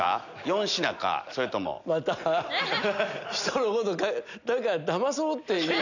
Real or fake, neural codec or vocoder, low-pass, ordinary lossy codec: real; none; 7.2 kHz; none